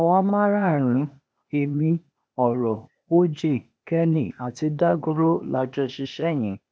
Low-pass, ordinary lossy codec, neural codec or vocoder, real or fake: none; none; codec, 16 kHz, 0.8 kbps, ZipCodec; fake